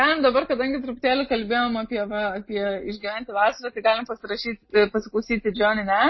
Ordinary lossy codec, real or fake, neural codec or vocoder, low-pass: MP3, 24 kbps; real; none; 7.2 kHz